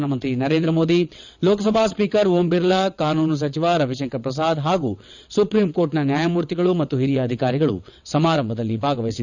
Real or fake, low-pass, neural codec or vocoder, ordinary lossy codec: fake; 7.2 kHz; vocoder, 22.05 kHz, 80 mel bands, WaveNeXt; none